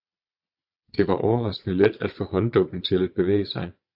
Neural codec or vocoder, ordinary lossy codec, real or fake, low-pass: vocoder, 22.05 kHz, 80 mel bands, Vocos; AAC, 48 kbps; fake; 5.4 kHz